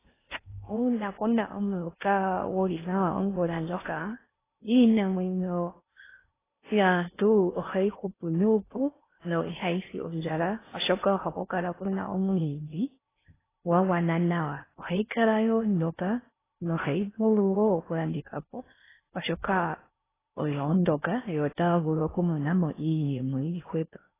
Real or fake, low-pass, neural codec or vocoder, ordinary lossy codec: fake; 3.6 kHz; codec, 16 kHz in and 24 kHz out, 0.6 kbps, FocalCodec, streaming, 4096 codes; AAC, 16 kbps